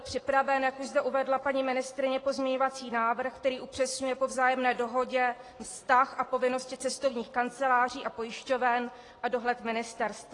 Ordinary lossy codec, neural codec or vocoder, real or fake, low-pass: AAC, 32 kbps; none; real; 10.8 kHz